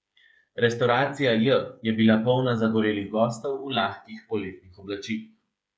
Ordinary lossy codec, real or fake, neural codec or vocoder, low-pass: none; fake; codec, 16 kHz, 8 kbps, FreqCodec, smaller model; none